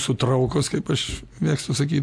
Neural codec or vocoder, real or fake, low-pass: none; real; 14.4 kHz